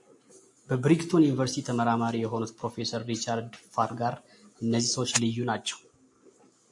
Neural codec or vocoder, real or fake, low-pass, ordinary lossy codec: none; real; 10.8 kHz; AAC, 64 kbps